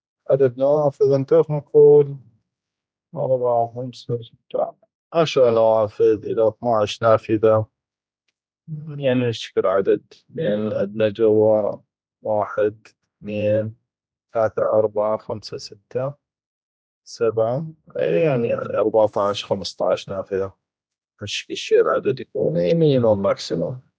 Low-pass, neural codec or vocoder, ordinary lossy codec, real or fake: none; codec, 16 kHz, 1 kbps, X-Codec, HuBERT features, trained on general audio; none; fake